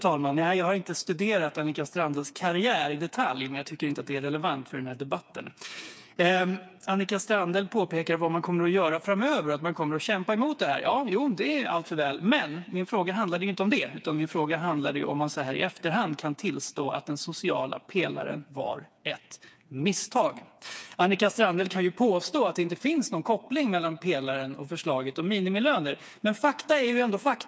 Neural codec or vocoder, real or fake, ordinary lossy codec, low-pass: codec, 16 kHz, 4 kbps, FreqCodec, smaller model; fake; none; none